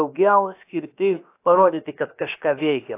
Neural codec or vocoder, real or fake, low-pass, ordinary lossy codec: codec, 16 kHz, about 1 kbps, DyCAST, with the encoder's durations; fake; 3.6 kHz; AAC, 24 kbps